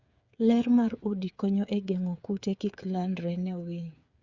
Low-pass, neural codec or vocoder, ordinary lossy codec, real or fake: 7.2 kHz; codec, 16 kHz, 8 kbps, FreqCodec, smaller model; Opus, 64 kbps; fake